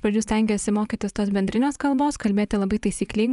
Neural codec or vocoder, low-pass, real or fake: vocoder, 24 kHz, 100 mel bands, Vocos; 10.8 kHz; fake